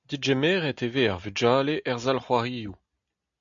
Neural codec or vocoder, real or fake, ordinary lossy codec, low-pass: none; real; MP3, 64 kbps; 7.2 kHz